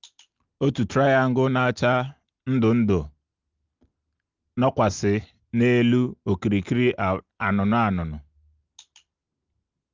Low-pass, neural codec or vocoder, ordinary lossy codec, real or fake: 7.2 kHz; none; Opus, 24 kbps; real